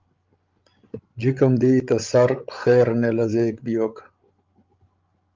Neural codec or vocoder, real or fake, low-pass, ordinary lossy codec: codec, 16 kHz, 16 kbps, FreqCodec, larger model; fake; 7.2 kHz; Opus, 32 kbps